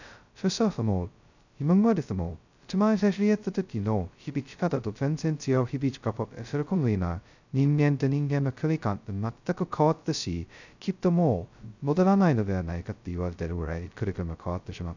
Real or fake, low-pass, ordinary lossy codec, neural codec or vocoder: fake; 7.2 kHz; none; codec, 16 kHz, 0.2 kbps, FocalCodec